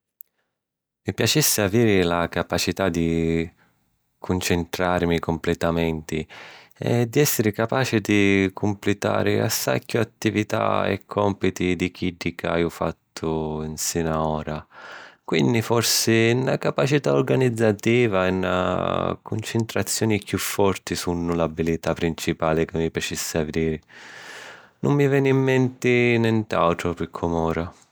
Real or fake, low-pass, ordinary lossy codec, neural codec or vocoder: real; none; none; none